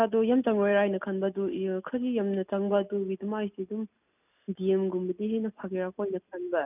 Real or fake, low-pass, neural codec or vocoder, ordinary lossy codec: real; 3.6 kHz; none; none